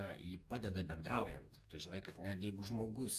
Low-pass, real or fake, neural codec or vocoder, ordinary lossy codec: 14.4 kHz; fake; codec, 44.1 kHz, 2.6 kbps, DAC; AAC, 96 kbps